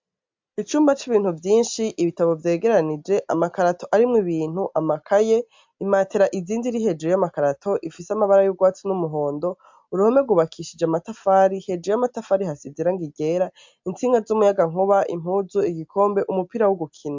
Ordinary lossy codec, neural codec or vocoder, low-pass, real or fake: MP3, 64 kbps; none; 7.2 kHz; real